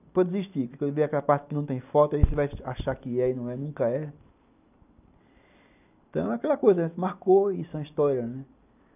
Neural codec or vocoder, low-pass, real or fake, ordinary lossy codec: none; 3.6 kHz; real; none